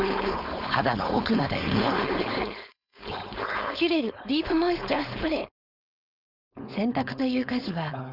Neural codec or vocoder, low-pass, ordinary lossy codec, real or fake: codec, 16 kHz, 4.8 kbps, FACodec; 5.4 kHz; none; fake